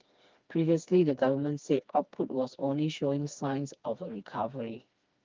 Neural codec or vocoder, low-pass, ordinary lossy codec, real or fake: codec, 16 kHz, 2 kbps, FreqCodec, smaller model; 7.2 kHz; Opus, 24 kbps; fake